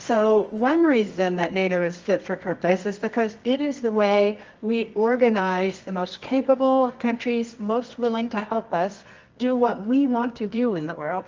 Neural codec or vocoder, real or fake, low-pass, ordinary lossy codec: codec, 24 kHz, 0.9 kbps, WavTokenizer, medium music audio release; fake; 7.2 kHz; Opus, 24 kbps